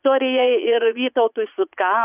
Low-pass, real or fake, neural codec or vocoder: 3.6 kHz; fake; vocoder, 44.1 kHz, 80 mel bands, Vocos